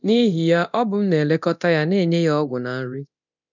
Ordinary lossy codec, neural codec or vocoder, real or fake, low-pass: none; codec, 24 kHz, 0.9 kbps, DualCodec; fake; 7.2 kHz